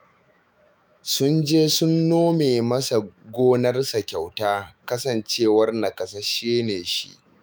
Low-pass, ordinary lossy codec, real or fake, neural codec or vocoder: none; none; fake; autoencoder, 48 kHz, 128 numbers a frame, DAC-VAE, trained on Japanese speech